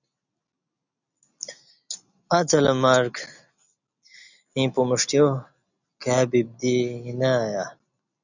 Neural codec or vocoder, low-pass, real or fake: none; 7.2 kHz; real